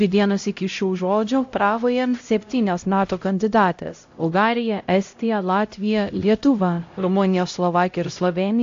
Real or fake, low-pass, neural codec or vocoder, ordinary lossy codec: fake; 7.2 kHz; codec, 16 kHz, 0.5 kbps, X-Codec, HuBERT features, trained on LibriSpeech; AAC, 48 kbps